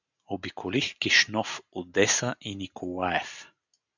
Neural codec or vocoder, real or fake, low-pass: none; real; 7.2 kHz